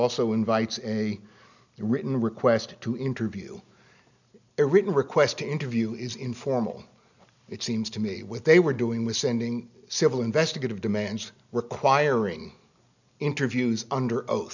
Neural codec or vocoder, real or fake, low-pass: none; real; 7.2 kHz